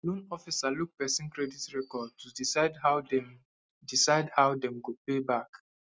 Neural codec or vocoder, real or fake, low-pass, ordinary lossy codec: none; real; none; none